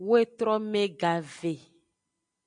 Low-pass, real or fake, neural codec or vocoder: 9.9 kHz; real; none